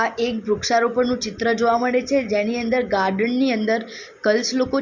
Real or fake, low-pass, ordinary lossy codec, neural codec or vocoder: real; 7.2 kHz; Opus, 64 kbps; none